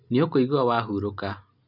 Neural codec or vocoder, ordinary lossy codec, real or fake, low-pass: none; none; real; 5.4 kHz